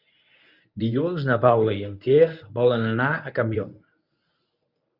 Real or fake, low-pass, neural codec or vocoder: fake; 5.4 kHz; codec, 24 kHz, 0.9 kbps, WavTokenizer, medium speech release version 1